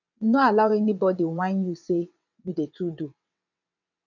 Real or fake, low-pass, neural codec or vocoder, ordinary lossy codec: fake; 7.2 kHz; vocoder, 22.05 kHz, 80 mel bands, WaveNeXt; none